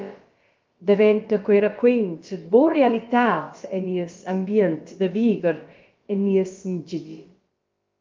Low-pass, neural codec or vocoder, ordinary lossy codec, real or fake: 7.2 kHz; codec, 16 kHz, about 1 kbps, DyCAST, with the encoder's durations; Opus, 24 kbps; fake